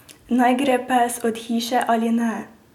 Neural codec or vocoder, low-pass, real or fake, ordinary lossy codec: none; 19.8 kHz; real; none